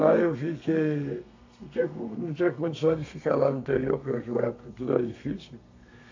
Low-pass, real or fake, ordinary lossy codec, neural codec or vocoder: 7.2 kHz; fake; AAC, 48 kbps; codec, 32 kHz, 1.9 kbps, SNAC